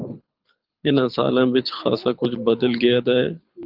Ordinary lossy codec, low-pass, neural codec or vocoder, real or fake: Opus, 32 kbps; 5.4 kHz; codec, 24 kHz, 6 kbps, HILCodec; fake